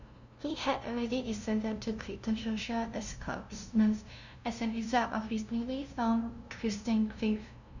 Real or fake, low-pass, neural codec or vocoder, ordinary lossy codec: fake; 7.2 kHz; codec, 16 kHz, 0.5 kbps, FunCodec, trained on LibriTTS, 25 frames a second; none